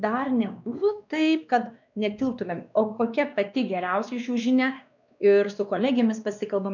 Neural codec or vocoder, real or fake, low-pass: codec, 16 kHz, 2 kbps, X-Codec, WavLM features, trained on Multilingual LibriSpeech; fake; 7.2 kHz